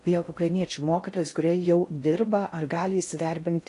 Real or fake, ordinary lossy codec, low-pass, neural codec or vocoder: fake; AAC, 48 kbps; 10.8 kHz; codec, 16 kHz in and 24 kHz out, 0.6 kbps, FocalCodec, streaming, 4096 codes